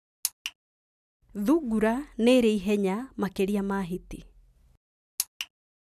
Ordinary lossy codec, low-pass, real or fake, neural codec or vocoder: none; 14.4 kHz; real; none